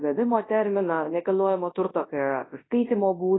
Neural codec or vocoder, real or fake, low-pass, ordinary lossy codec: codec, 24 kHz, 0.9 kbps, WavTokenizer, large speech release; fake; 7.2 kHz; AAC, 16 kbps